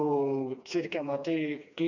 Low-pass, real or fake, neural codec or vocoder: 7.2 kHz; fake; codec, 16 kHz, 2 kbps, FreqCodec, smaller model